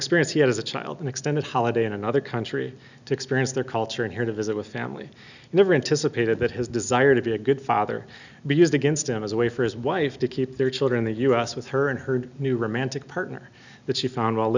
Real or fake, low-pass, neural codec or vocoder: real; 7.2 kHz; none